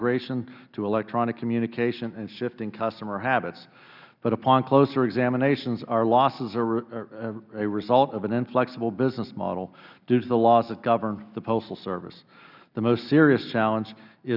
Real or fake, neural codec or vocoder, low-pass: real; none; 5.4 kHz